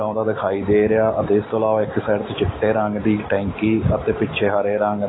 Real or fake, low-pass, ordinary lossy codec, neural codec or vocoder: real; 7.2 kHz; AAC, 16 kbps; none